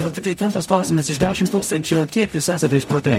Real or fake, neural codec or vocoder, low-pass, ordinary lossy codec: fake; codec, 44.1 kHz, 0.9 kbps, DAC; 19.8 kHz; MP3, 64 kbps